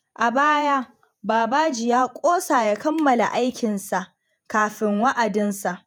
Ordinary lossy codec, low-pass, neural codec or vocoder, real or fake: none; none; vocoder, 48 kHz, 128 mel bands, Vocos; fake